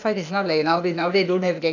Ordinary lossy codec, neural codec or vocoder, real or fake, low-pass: none; codec, 16 kHz, 0.8 kbps, ZipCodec; fake; 7.2 kHz